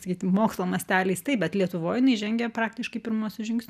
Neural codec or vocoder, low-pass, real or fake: none; 14.4 kHz; real